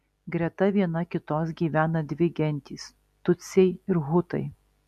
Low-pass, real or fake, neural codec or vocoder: 14.4 kHz; real; none